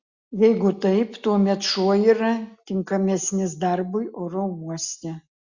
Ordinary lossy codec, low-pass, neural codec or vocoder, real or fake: Opus, 64 kbps; 7.2 kHz; none; real